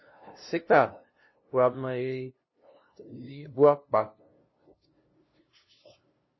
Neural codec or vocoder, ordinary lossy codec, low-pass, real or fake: codec, 16 kHz, 0.5 kbps, FunCodec, trained on LibriTTS, 25 frames a second; MP3, 24 kbps; 7.2 kHz; fake